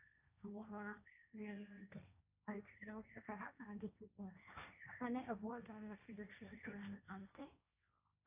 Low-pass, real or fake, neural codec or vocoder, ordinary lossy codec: 3.6 kHz; fake; codec, 16 kHz, 1.1 kbps, Voila-Tokenizer; none